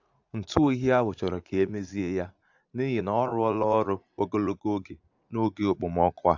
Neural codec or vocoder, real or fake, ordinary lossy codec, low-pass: vocoder, 44.1 kHz, 80 mel bands, Vocos; fake; AAC, 48 kbps; 7.2 kHz